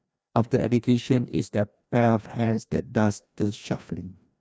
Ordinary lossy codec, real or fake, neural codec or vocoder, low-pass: none; fake; codec, 16 kHz, 1 kbps, FreqCodec, larger model; none